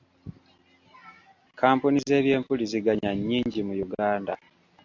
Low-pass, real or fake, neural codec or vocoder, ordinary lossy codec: 7.2 kHz; real; none; AAC, 48 kbps